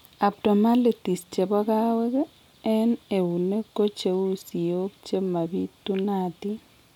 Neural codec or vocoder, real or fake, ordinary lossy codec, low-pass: none; real; none; 19.8 kHz